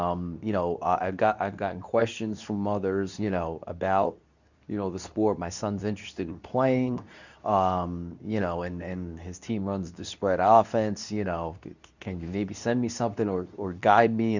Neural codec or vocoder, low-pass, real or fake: codec, 24 kHz, 0.9 kbps, WavTokenizer, medium speech release version 2; 7.2 kHz; fake